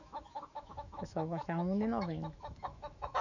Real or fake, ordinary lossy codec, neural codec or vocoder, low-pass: fake; none; autoencoder, 48 kHz, 128 numbers a frame, DAC-VAE, trained on Japanese speech; 7.2 kHz